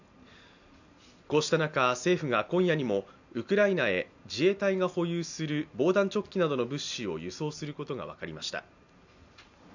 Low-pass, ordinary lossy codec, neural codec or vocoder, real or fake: 7.2 kHz; none; none; real